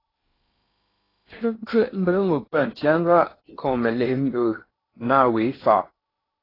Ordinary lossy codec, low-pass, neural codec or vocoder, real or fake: AAC, 24 kbps; 5.4 kHz; codec, 16 kHz in and 24 kHz out, 0.8 kbps, FocalCodec, streaming, 65536 codes; fake